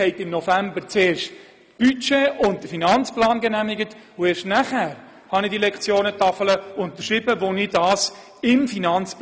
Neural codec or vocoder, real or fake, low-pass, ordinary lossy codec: none; real; none; none